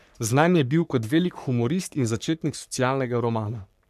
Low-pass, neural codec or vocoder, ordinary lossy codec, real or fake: 14.4 kHz; codec, 44.1 kHz, 3.4 kbps, Pupu-Codec; none; fake